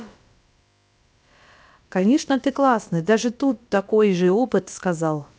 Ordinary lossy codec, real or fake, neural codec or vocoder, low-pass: none; fake; codec, 16 kHz, about 1 kbps, DyCAST, with the encoder's durations; none